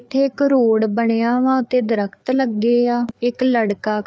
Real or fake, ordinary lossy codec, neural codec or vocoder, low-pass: fake; none; codec, 16 kHz, 4 kbps, FreqCodec, larger model; none